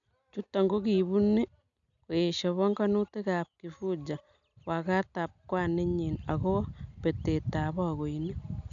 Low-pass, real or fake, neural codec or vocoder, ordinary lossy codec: 7.2 kHz; real; none; none